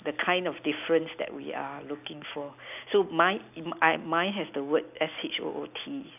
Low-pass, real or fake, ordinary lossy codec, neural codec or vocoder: 3.6 kHz; real; none; none